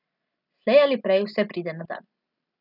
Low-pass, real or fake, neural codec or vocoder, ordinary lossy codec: 5.4 kHz; real; none; none